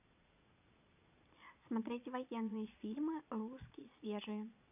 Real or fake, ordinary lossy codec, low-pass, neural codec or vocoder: fake; none; 3.6 kHz; vocoder, 22.05 kHz, 80 mel bands, Vocos